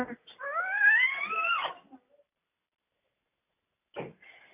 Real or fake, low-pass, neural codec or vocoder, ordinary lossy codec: real; 3.6 kHz; none; AAC, 24 kbps